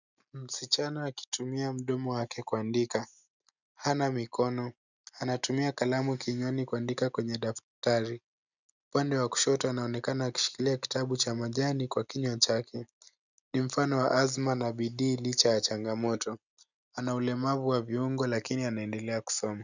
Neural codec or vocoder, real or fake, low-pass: none; real; 7.2 kHz